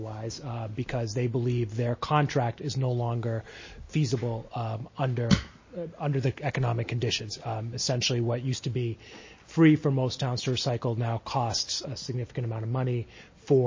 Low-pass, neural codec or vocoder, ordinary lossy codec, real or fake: 7.2 kHz; none; MP3, 32 kbps; real